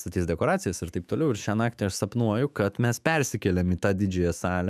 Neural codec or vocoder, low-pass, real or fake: autoencoder, 48 kHz, 128 numbers a frame, DAC-VAE, trained on Japanese speech; 14.4 kHz; fake